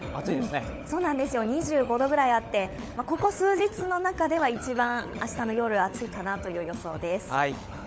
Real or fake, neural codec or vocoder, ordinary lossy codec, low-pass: fake; codec, 16 kHz, 16 kbps, FunCodec, trained on LibriTTS, 50 frames a second; none; none